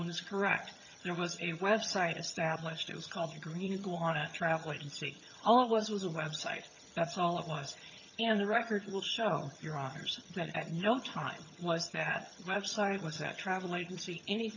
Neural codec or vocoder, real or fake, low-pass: vocoder, 22.05 kHz, 80 mel bands, HiFi-GAN; fake; 7.2 kHz